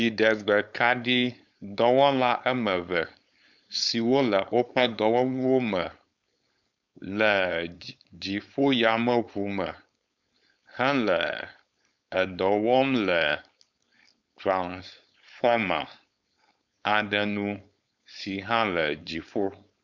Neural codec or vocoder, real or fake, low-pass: codec, 16 kHz, 4.8 kbps, FACodec; fake; 7.2 kHz